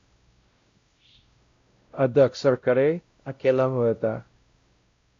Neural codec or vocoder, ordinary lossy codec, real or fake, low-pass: codec, 16 kHz, 0.5 kbps, X-Codec, WavLM features, trained on Multilingual LibriSpeech; AAC, 48 kbps; fake; 7.2 kHz